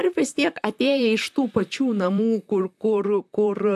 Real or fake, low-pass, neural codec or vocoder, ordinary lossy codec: fake; 14.4 kHz; vocoder, 44.1 kHz, 128 mel bands, Pupu-Vocoder; AAC, 96 kbps